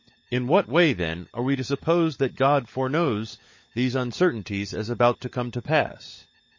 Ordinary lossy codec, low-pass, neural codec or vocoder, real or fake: MP3, 32 kbps; 7.2 kHz; codec, 16 kHz, 16 kbps, FunCodec, trained on LibriTTS, 50 frames a second; fake